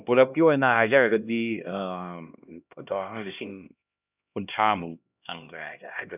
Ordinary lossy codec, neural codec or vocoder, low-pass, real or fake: none; codec, 16 kHz, 1 kbps, X-Codec, HuBERT features, trained on LibriSpeech; 3.6 kHz; fake